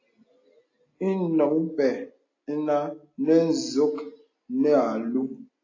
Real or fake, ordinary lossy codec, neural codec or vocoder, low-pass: real; AAC, 32 kbps; none; 7.2 kHz